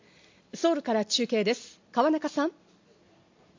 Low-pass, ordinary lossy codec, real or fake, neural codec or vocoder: 7.2 kHz; MP3, 48 kbps; real; none